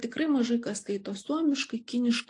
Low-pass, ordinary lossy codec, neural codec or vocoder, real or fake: 10.8 kHz; AAC, 48 kbps; none; real